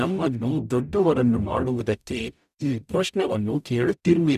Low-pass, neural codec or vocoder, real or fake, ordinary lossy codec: 14.4 kHz; codec, 44.1 kHz, 0.9 kbps, DAC; fake; none